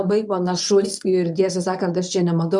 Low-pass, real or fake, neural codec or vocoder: 10.8 kHz; fake; codec, 24 kHz, 0.9 kbps, WavTokenizer, medium speech release version 1